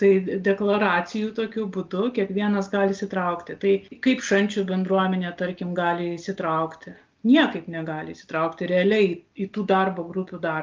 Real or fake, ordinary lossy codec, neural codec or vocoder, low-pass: real; Opus, 24 kbps; none; 7.2 kHz